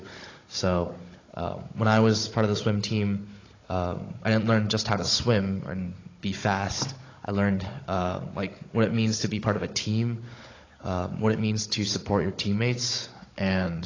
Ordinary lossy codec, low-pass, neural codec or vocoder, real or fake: AAC, 32 kbps; 7.2 kHz; codec, 16 kHz, 16 kbps, FunCodec, trained on Chinese and English, 50 frames a second; fake